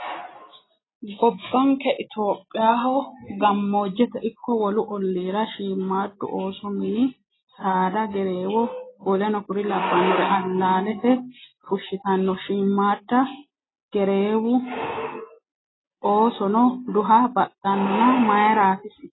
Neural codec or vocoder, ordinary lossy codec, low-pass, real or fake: none; AAC, 16 kbps; 7.2 kHz; real